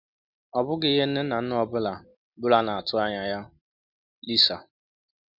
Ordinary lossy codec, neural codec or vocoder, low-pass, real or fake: none; none; 5.4 kHz; real